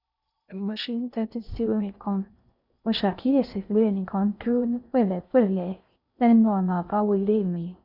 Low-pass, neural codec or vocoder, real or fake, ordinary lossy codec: 5.4 kHz; codec, 16 kHz in and 24 kHz out, 0.6 kbps, FocalCodec, streaming, 2048 codes; fake; none